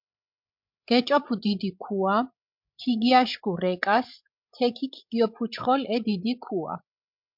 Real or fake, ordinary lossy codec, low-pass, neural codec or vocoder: fake; MP3, 48 kbps; 5.4 kHz; codec, 16 kHz, 16 kbps, FreqCodec, larger model